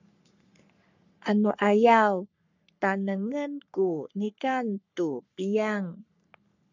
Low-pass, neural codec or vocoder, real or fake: 7.2 kHz; codec, 44.1 kHz, 3.4 kbps, Pupu-Codec; fake